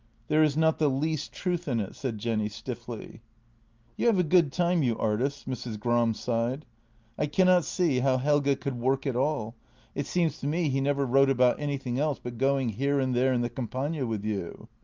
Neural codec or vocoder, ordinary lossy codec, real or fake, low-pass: none; Opus, 24 kbps; real; 7.2 kHz